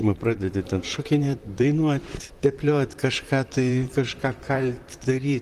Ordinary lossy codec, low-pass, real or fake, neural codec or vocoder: Opus, 24 kbps; 14.4 kHz; fake; vocoder, 44.1 kHz, 128 mel bands, Pupu-Vocoder